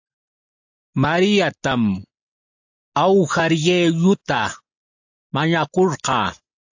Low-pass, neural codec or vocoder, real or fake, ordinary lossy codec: 7.2 kHz; none; real; AAC, 48 kbps